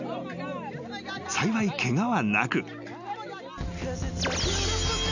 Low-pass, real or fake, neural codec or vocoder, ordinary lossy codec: 7.2 kHz; real; none; none